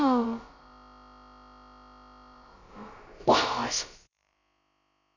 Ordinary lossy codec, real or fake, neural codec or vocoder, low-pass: none; fake; codec, 16 kHz, about 1 kbps, DyCAST, with the encoder's durations; 7.2 kHz